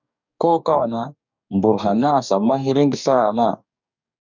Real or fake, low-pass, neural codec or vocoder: fake; 7.2 kHz; codec, 44.1 kHz, 2.6 kbps, DAC